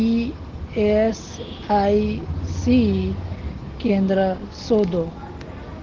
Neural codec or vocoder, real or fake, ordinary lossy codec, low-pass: none; real; Opus, 16 kbps; 7.2 kHz